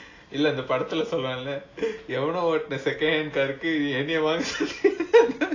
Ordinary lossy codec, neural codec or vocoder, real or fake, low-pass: AAC, 32 kbps; none; real; 7.2 kHz